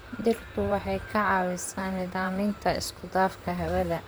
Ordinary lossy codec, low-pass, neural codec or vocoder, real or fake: none; none; vocoder, 44.1 kHz, 128 mel bands, Pupu-Vocoder; fake